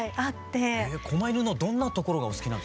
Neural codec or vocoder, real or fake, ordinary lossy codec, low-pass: none; real; none; none